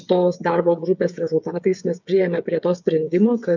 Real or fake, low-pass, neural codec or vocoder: fake; 7.2 kHz; codec, 16 kHz, 8 kbps, FreqCodec, smaller model